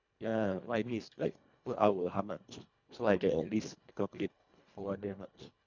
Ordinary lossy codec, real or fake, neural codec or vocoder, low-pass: none; fake; codec, 24 kHz, 1.5 kbps, HILCodec; 7.2 kHz